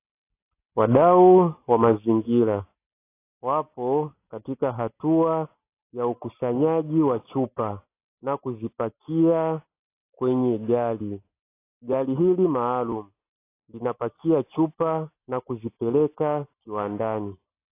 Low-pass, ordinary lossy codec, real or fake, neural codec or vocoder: 3.6 kHz; AAC, 24 kbps; real; none